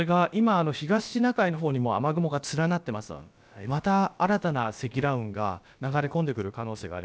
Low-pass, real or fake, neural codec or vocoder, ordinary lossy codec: none; fake; codec, 16 kHz, about 1 kbps, DyCAST, with the encoder's durations; none